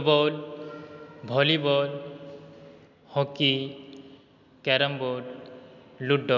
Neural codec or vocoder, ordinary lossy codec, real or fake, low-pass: none; none; real; 7.2 kHz